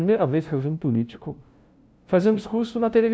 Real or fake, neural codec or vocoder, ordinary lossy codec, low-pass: fake; codec, 16 kHz, 0.5 kbps, FunCodec, trained on LibriTTS, 25 frames a second; none; none